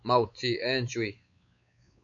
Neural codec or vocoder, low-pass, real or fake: codec, 16 kHz, 4 kbps, X-Codec, WavLM features, trained on Multilingual LibriSpeech; 7.2 kHz; fake